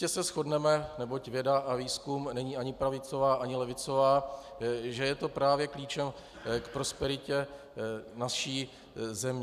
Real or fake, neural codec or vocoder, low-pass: real; none; 14.4 kHz